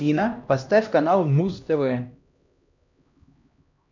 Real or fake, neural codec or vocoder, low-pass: fake; codec, 16 kHz, 1 kbps, X-Codec, HuBERT features, trained on LibriSpeech; 7.2 kHz